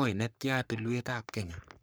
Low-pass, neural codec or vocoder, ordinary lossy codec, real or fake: none; codec, 44.1 kHz, 3.4 kbps, Pupu-Codec; none; fake